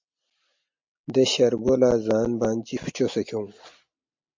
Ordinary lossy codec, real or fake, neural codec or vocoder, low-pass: MP3, 48 kbps; real; none; 7.2 kHz